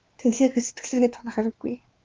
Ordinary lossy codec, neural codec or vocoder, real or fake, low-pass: Opus, 24 kbps; codec, 16 kHz, 2 kbps, X-Codec, WavLM features, trained on Multilingual LibriSpeech; fake; 7.2 kHz